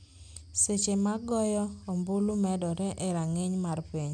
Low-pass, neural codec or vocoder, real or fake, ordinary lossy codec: 9.9 kHz; none; real; MP3, 96 kbps